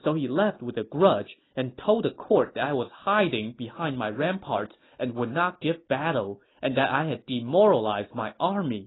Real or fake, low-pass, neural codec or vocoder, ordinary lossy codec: real; 7.2 kHz; none; AAC, 16 kbps